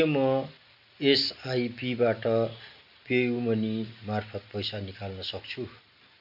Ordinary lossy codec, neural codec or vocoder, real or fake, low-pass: none; none; real; 5.4 kHz